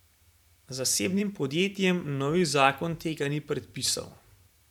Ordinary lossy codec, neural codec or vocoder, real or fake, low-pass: none; vocoder, 44.1 kHz, 128 mel bands every 512 samples, BigVGAN v2; fake; 19.8 kHz